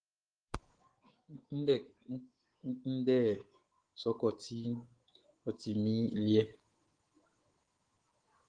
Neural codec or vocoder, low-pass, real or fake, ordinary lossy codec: codec, 24 kHz, 3.1 kbps, DualCodec; 9.9 kHz; fake; Opus, 16 kbps